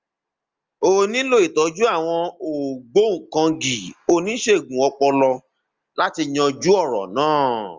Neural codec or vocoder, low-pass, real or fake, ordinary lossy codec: none; 7.2 kHz; real; Opus, 32 kbps